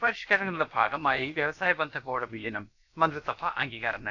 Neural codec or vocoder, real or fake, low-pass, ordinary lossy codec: codec, 16 kHz, about 1 kbps, DyCAST, with the encoder's durations; fake; 7.2 kHz; none